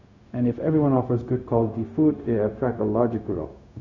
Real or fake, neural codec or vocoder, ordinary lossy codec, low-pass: fake; codec, 16 kHz, 0.4 kbps, LongCat-Audio-Codec; none; 7.2 kHz